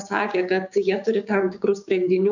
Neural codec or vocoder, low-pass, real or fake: codec, 16 kHz, 6 kbps, DAC; 7.2 kHz; fake